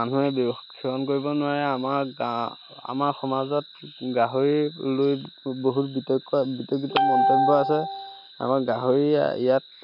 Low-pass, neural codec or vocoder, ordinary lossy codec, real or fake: 5.4 kHz; none; none; real